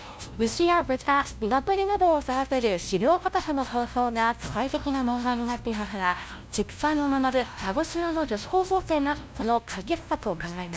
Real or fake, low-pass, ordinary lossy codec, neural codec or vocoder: fake; none; none; codec, 16 kHz, 0.5 kbps, FunCodec, trained on LibriTTS, 25 frames a second